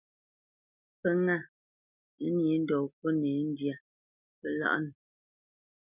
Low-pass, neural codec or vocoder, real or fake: 3.6 kHz; none; real